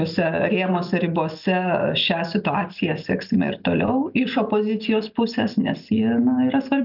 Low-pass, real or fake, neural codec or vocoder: 5.4 kHz; real; none